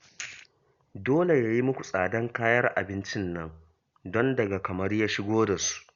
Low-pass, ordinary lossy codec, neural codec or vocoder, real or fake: 7.2 kHz; Opus, 64 kbps; none; real